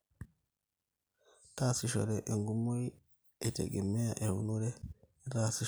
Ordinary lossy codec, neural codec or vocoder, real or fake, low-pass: none; none; real; none